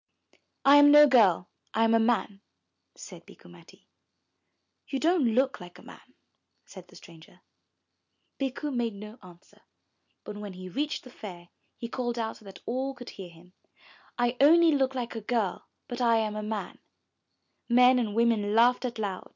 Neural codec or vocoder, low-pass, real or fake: none; 7.2 kHz; real